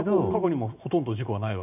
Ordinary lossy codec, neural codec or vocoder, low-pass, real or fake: none; none; 3.6 kHz; real